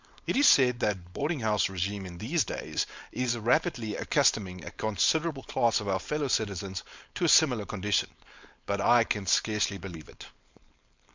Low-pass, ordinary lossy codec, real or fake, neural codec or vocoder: 7.2 kHz; MP3, 64 kbps; fake; codec, 16 kHz, 4.8 kbps, FACodec